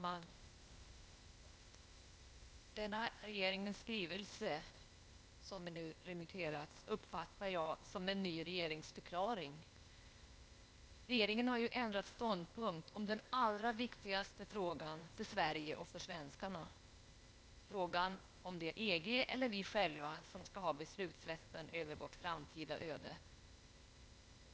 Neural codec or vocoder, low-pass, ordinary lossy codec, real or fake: codec, 16 kHz, 0.8 kbps, ZipCodec; none; none; fake